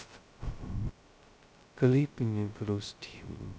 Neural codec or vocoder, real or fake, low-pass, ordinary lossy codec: codec, 16 kHz, 0.2 kbps, FocalCodec; fake; none; none